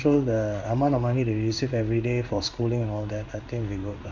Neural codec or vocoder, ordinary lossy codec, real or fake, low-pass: codec, 16 kHz in and 24 kHz out, 1 kbps, XY-Tokenizer; none; fake; 7.2 kHz